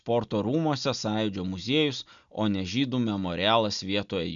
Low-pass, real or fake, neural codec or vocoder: 7.2 kHz; real; none